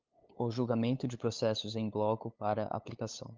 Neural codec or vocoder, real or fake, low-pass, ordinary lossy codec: codec, 16 kHz, 8 kbps, FunCodec, trained on LibriTTS, 25 frames a second; fake; 7.2 kHz; Opus, 32 kbps